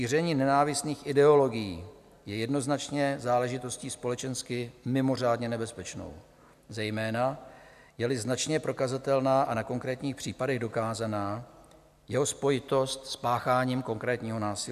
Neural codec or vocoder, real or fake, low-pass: none; real; 14.4 kHz